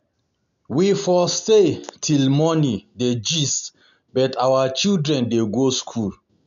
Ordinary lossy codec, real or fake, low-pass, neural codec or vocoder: none; real; 7.2 kHz; none